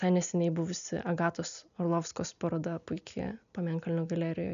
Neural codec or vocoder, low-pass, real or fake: none; 7.2 kHz; real